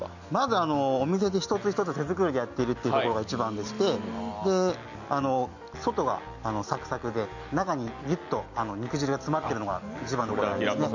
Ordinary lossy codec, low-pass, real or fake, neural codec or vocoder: none; 7.2 kHz; real; none